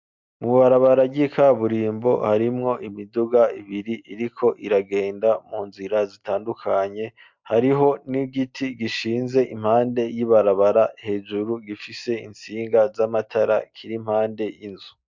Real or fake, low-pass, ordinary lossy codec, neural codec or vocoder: real; 7.2 kHz; MP3, 64 kbps; none